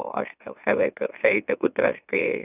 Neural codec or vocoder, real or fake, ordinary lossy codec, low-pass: autoencoder, 44.1 kHz, a latent of 192 numbers a frame, MeloTTS; fake; none; 3.6 kHz